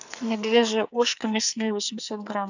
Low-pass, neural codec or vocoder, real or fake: 7.2 kHz; codec, 44.1 kHz, 2.6 kbps, SNAC; fake